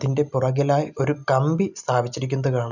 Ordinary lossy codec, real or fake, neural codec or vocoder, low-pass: none; real; none; 7.2 kHz